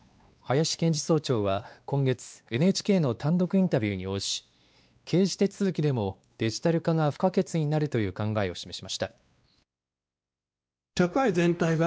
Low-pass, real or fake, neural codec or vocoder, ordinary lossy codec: none; fake; codec, 16 kHz, 2 kbps, X-Codec, WavLM features, trained on Multilingual LibriSpeech; none